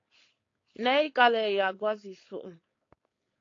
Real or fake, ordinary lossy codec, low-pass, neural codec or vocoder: fake; AAC, 32 kbps; 7.2 kHz; codec, 16 kHz, 4.8 kbps, FACodec